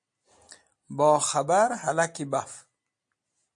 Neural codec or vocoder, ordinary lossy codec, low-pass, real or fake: none; MP3, 48 kbps; 9.9 kHz; real